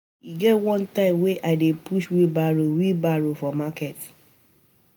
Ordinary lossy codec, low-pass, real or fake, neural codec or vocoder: none; none; real; none